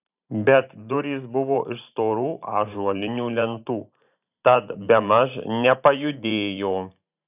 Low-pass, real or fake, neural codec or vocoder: 3.6 kHz; fake; vocoder, 44.1 kHz, 128 mel bands every 512 samples, BigVGAN v2